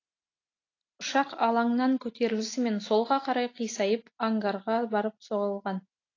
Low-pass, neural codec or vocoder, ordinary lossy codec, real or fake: 7.2 kHz; none; AAC, 32 kbps; real